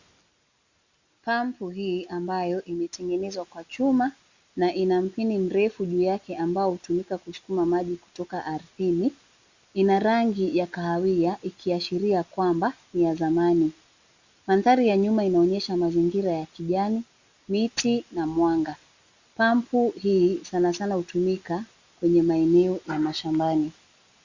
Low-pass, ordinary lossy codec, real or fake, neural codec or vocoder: 7.2 kHz; Opus, 64 kbps; real; none